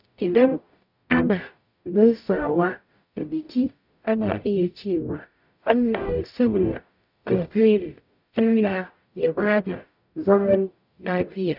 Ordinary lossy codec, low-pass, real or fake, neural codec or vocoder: none; 5.4 kHz; fake; codec, 44.1 kHz, 0.9 kbps, DAC